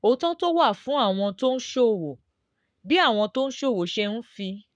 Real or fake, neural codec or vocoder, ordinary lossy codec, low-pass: fake; codec, 44.1 kHz, 7.8 kbps, Pupu-Codec; none; 9.9 kHz